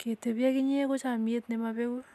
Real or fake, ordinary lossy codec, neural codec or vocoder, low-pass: real; none; none; 14.4 kHz